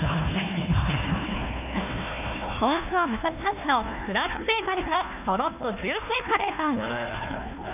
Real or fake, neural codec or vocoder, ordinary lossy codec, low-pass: fake; codec, 16 kHz, 1 kbps, FunCodec, trained on Chinese and English, 50 frames a second; none; 3.6 kHz